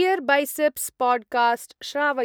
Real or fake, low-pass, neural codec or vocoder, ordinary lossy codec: real; none; none; none